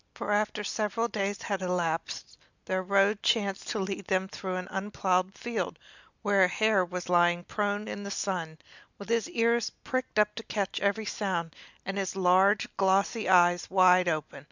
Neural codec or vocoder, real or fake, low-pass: none; real; 7.2 kHz